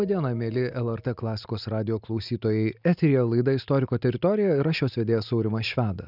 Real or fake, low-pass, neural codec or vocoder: real; 5.4 kHz; none